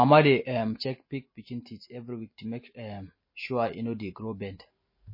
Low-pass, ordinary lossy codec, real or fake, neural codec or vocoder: 5.4 kHz; MP3, 24 kbps; real; none